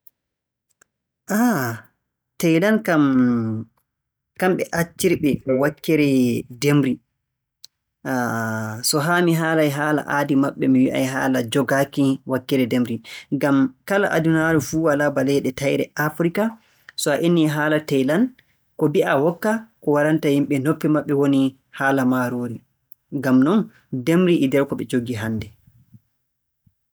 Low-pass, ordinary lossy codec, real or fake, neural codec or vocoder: none; none; real; none